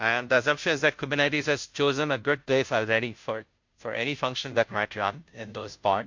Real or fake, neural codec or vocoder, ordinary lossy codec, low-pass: fake; codec, 16 kHz, 0.5 kbps, FunCodec, trained on Chinese and English, 25 frames a second; MP3, 48 kbps; 7.2 kHz